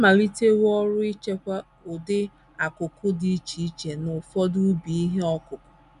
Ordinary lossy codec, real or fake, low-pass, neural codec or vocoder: none; real; 10.8 kHz; none